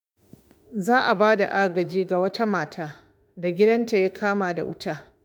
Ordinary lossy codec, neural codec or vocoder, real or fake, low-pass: none; autoencoder, 48 kHz, 32 numbers a frame, DAC-VAE, trained on Japanese speech; fake; none